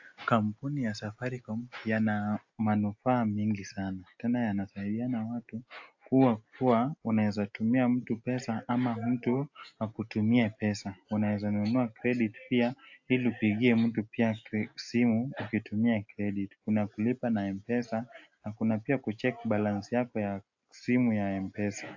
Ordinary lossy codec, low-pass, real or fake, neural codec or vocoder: AAC, 48 kbps; 7.2 kHz; real; none